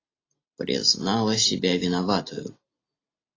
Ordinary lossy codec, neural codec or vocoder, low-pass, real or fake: AAC, 32 kbps; none; 7.2 kHz; real